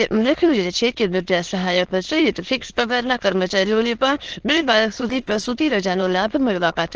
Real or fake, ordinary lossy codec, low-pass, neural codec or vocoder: fake; Opus, 16 kbps; 7.2 kHz; autoencoder, 22.05 kHz, a latent of 192 numbers a frame, VITS, trained on many speakers